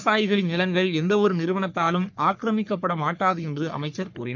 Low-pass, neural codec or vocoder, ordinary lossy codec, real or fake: 7.2 kHz; codec, 44.1 kHz, 3.4 kbps, Pupu-Codec; none; fake